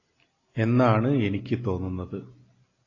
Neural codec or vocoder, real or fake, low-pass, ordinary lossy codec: none; real; 7.2 kHz; AAC, 32 kbps